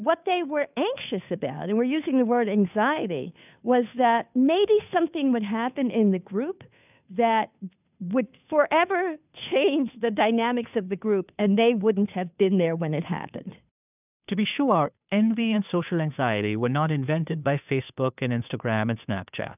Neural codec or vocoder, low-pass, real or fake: codec, 16 kHz, 2 kbps, FunCodec, trained on Chinese and English, 25 frames a second; 3.6 kHz; fake